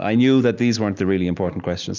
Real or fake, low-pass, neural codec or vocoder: real; 7.2 kHz; none